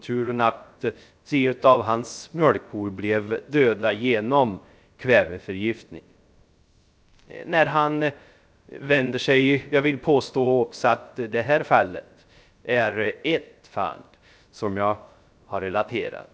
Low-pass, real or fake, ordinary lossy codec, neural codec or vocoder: none; fake; none; codec, 16 kHz, 0.3 kbps, FocalCodec